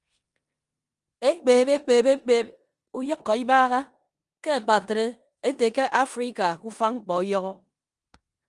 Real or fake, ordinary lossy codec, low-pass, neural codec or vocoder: fake; Opus, 32 kbps; 10.8 kHz; codec, 16 kHz in and 24 kHz out, 0.9 kbps, LongCat-Audio-Codec, four codebook decoder